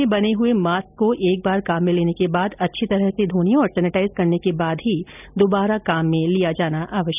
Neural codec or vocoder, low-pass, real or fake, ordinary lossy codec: none; 3.6 kHz; real; none